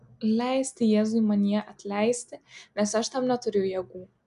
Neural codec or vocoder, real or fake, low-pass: none; real; 10.8 kHz